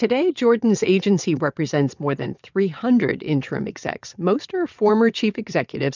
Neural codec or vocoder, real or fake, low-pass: vocoder, 22.05 kHz, 80 mel bands, WaveNeXt; fake; 7.2 kHz